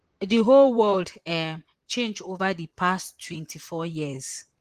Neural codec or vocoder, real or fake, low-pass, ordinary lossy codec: vocoder, 44.1 kHz, 128 mel bands, Pupu-Vocoder; fake; 14.4 kHz; Opus, 24 kbps